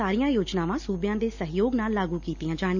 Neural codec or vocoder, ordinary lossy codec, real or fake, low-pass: none; none; real; 7.2 kHz